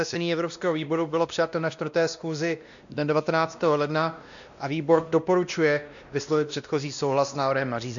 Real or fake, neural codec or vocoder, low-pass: fake; codec, 16 kHz, 1 kbps, X-Codec, WavLM features, trained on Multilingual LibriSpeech; 7.2 kHz